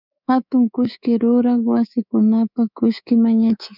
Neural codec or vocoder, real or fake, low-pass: codec, 24 kHz, 3.1 kbps, DualCodec; fake; 5.4 kHz